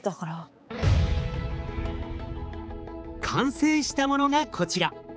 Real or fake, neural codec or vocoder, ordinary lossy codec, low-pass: fake; codec, 16 kHz, 4 kbps, X-Codec, HuBERT features, trained on balanced general audio; none; none